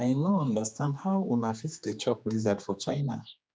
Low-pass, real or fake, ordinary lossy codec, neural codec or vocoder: none; fake; none; codec, 16 kHz, 2 kbps, X-Codec, HuBERT features, trained on general audio